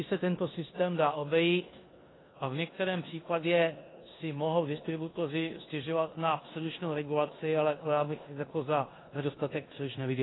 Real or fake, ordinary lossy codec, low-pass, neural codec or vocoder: fake; AAC, 16 kbps; 7.2 kHz; codec, 16 kHz in and 24 kHz out, 0.9 kbps, LongCat-Audio-Codec, four codebook decoder